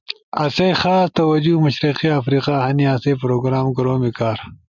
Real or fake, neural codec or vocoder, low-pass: real; none; 7.2 kHz